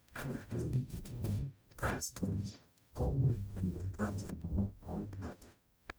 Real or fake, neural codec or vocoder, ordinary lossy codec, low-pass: fake; codec, 44.1 kHz, 0.9 kbps, DAC; none; none